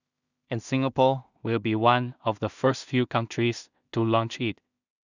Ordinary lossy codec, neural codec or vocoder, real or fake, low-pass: none; codec, 16 kHz in and 24 kHz out, 0.4 kbps, LongCat-Audio-Codec, two codebook decoder; fake; 7.2 kHz